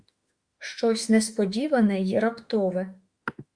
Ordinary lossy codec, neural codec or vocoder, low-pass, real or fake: Opus, 64 kbps; autoencoder, 48 kHz, 32 numbers a frame, DAC-VAE, trained on Japanese speech; 9.9 kHz; fake